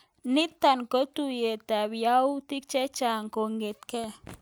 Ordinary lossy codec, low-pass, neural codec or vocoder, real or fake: none; none; none; real